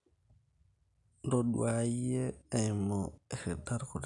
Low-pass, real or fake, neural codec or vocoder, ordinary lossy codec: 10.8 kHz; real; none; none